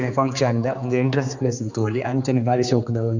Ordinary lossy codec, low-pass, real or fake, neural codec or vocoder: none; 7.2 kHz; fake; codec, 16 kHz, 2 kbps, X-Codec, HuBERT features, trained on general audio